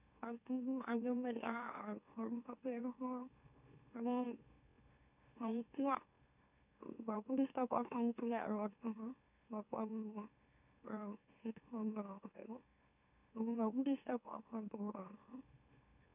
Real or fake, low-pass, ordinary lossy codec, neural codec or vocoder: fake; 3.6 kHz; none; autoencoder, 44.1 kHz, a latent of 192 numbers a frame, MeloTTS